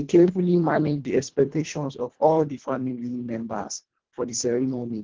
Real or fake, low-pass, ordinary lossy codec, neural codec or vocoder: fake; 7.2 kHz; Opus, 16 kbps; codec, 24 kHz, 1.5 kbps, HILCodec